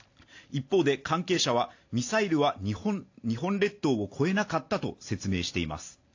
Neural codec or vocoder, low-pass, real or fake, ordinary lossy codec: none; 7.2 kHz; real; AAC, 48 kbps